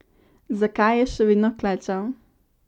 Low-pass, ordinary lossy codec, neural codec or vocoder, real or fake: 19.8 kHz; none; none; real